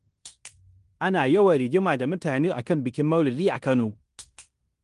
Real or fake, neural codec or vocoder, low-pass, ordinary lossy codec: fake; codec, 16 kHz in and 24 kHz out, 0.9 kbps, LongCat-Audio-Codec, fine tuned four codebook decoder; 10.8 kHz; Opus, 24 kbps